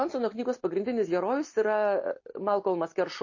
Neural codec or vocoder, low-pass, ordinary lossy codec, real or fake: none; 7.2 kHz; MP3, 32 kbps; real